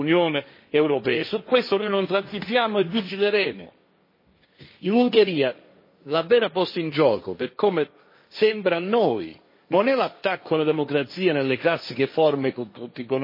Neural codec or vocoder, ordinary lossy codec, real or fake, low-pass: codec, 16 kHz, 1.1 kbps, Voila-Tokenizer; MP3, 24 kbps; fake; 5.4 kHz